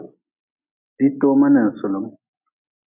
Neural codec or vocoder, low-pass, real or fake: none; 3.6 kHz; real